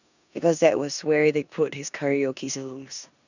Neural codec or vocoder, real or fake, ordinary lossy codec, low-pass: codec, 16 kHz in and 24 kHz out, 0.9 kbps, LongCat-Audio-Codec, four codebook decoder; fake; none; 7.2 kHz